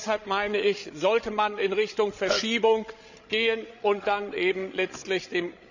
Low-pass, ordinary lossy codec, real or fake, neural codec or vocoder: 7.2 kHz; none; fake; codec, 16 kHz, 16 kbps, FreqCodec, larger model